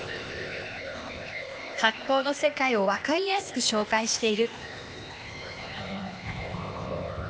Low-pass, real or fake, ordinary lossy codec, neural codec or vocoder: none; fake; none; codec, 16 kHz, 0.8 kbps, ZipCodec